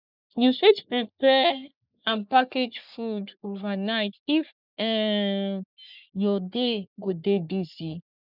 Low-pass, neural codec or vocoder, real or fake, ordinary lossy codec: 5.4 kHz; codec, 44.1 kHz, 3.4 kbps, Pupu-Codec; fake; none